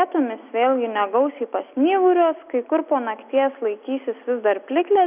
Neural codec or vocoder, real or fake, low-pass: none; real; 3.6 kHz